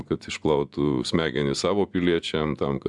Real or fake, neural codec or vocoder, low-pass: fake; vocoder, 24 kHz, 100 mel bands, Vocos; 10.8 kHz